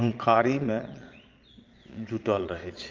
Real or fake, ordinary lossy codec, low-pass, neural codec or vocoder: fake; Opus, 24 kbps; 7.2 kHz; vocoder, 22.05 kHz, 80 mel bands, Vocos